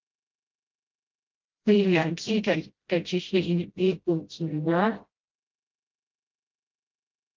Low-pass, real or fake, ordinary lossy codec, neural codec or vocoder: 7.2 kHz; fake; Opus, 32 kbps; codec, 16 kHz, 0.5 kbps, FreqCodec, smaller model